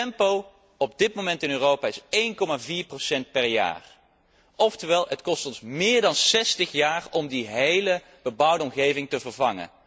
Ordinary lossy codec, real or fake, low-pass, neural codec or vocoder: none; real; none; none